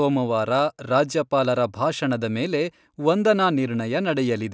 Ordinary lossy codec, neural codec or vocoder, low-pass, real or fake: none; none; none; real